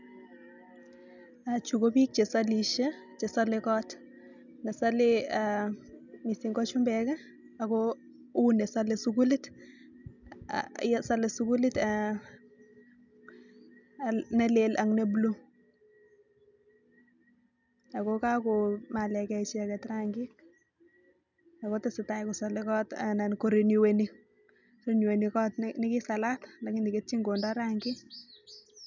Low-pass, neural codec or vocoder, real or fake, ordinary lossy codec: 7.2 kHz; none; real; none